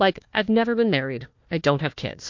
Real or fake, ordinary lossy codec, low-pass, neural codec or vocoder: fake; MP3, 48 kbps; 7.2 kHz; codec, 16 kHz, 1 kbps, FunCodec, trained on Chinese and English, 50 frames a second